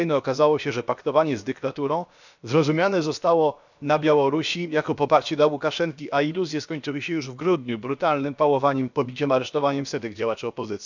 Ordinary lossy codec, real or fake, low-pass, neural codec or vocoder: none; fake; 7.2 kHz; codec, 16 kHz, about 1 kbps, DyCAST, with the encoder's durations